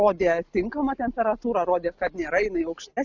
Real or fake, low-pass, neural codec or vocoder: real; 7.2 kHz; none